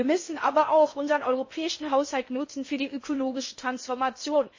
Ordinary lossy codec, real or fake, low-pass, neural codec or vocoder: MP3, 32 kbps; fake; 7.2 kHz; codec, 16 kHz in and 24 kHz out, 0.6 kbps, FocalCodec, streaming, 4096 codes